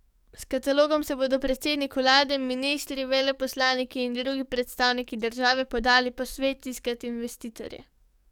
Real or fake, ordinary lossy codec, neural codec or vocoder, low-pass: fake; none; codec, 44.1 kHz, 7.8 kbps, DAC; 19.8 kHz